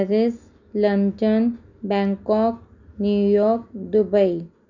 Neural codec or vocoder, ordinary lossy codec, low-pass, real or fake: none; none; 7.2 kHz; real